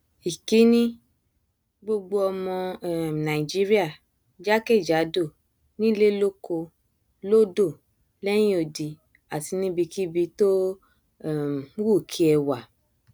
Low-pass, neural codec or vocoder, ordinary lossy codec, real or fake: none; none; none; real